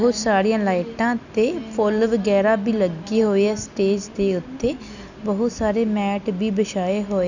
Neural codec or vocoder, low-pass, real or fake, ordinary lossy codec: none; 7.2 kHz; real; none